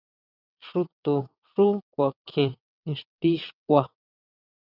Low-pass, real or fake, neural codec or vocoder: 5.4 kHz; fake; vocoder, 22.05 kHz, 80 mel bands, WaveNeXt